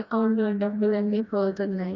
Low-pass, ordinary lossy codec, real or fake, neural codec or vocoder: 7.2 kHz; none; fake; codec, 16 kHz, 1 kbps, FreqCodec, smaller model